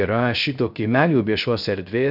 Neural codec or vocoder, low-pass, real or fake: codec, 16 kHz, 0.3 kbps, FocalCodec; 5.4 kHz; fake